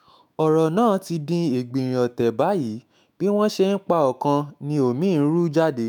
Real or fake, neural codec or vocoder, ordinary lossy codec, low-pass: fake; autoencoder, 48 kHz, 128 numbers a frame, DAC-VAE, trained on Japanese speech; none; none